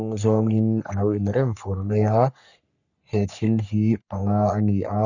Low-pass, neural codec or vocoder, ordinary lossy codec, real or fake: 7.2 kHz; codec, 44.1 kHz, 3.4 kbps, Pupu-Codec; none; fake